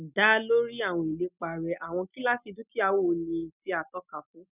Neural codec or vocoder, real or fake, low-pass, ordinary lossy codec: none; real; 3.6 kHz; none